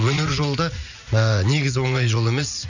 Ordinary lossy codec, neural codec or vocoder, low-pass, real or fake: none; vocoder, 44.1 kHz, 80 mel bands, Vocos; 7.2 kHz; fake